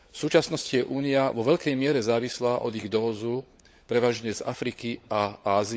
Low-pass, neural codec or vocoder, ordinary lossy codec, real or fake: none; codec, 16 kHz, 16 kbps, FunCodec, trained on LibriTTS, 50 frames a second; none; fake